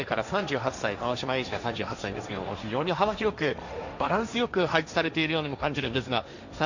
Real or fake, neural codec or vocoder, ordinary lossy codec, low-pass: fake; codec, 16 kHz, 1.1 kbps, Voila-Tokenizer; none; 7.2 kHz